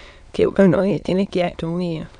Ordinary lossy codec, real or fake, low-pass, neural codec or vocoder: none; fake; 9.9 kHz; autoencoder, 22.05 kHz, a latent of 192 numbers a frame, VITS, trained on many speakers